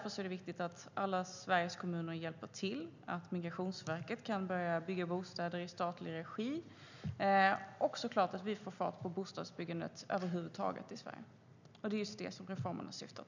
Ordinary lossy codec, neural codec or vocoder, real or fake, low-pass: none; none; real; 7.2 kHz